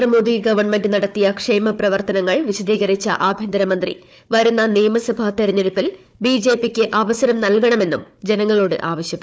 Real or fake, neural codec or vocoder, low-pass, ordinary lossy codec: fake; codec, 16 kHz, 4 kbps, FunCodec, trained on Chinese and English, 50 frames a second; none; none